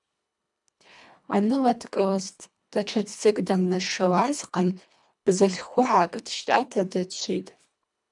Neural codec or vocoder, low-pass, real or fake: codec, 24 kHz, 1.5 kbps, HILCodec; 10.8 kHz; fake